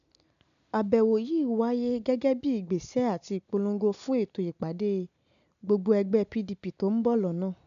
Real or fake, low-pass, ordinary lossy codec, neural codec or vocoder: real; 7.2 kHz; none; none